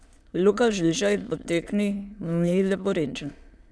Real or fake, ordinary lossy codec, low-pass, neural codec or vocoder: fake; none; none; autoencoder, 22.05 kHz, a latent of 192 numbers a frame, VITS, trained on many speakers